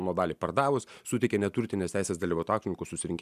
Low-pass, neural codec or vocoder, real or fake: 14.4 kHz; none; real